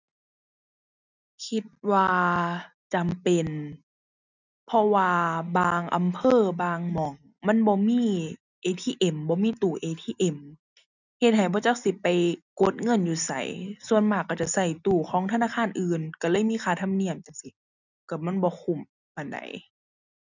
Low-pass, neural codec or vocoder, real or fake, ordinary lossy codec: 7.2 kHz; none; real; none